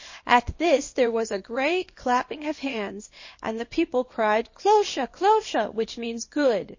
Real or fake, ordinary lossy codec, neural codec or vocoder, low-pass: fake; MP3, 32 kbps; codec, 24 kHz, 0.9 kbps, WavTokenizer, small release; 7.2 kHz